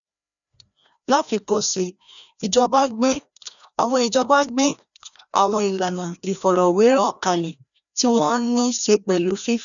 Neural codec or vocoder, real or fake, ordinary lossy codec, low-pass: codec, 16 kHz, 1 kbps, FreqCodec, larger model; fake; none; 7.2 kHz